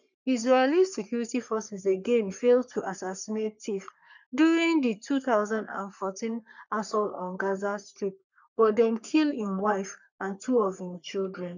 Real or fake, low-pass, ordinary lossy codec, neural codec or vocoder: fake; 7.2 kHz; none; codec, 44.1 kHz, 3.4 kbps, Pupu-Codec